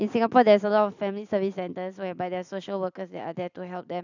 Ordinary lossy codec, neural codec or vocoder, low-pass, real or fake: none; none; 7.2 kHz; real